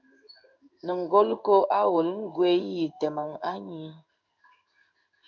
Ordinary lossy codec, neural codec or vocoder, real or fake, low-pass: MP3, 64 kbps; codec, 16 kHz in and 24 kHz out, 1 kbps, XY-Tokenizer; fake; 7.2 kHz